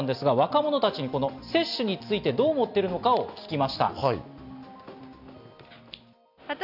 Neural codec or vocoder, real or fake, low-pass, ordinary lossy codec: none; real; 5.4 kHz; none